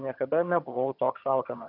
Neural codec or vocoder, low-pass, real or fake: vocoder, 22.05 kHz, 80 mel bands, HiFi-GAN; 5.4 kHz; fake